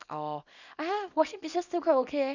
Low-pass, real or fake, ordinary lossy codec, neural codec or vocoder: 7.2 kHz; fake; none; codec, 24 kHz, 0.9 kbps, WavTokenizer, small release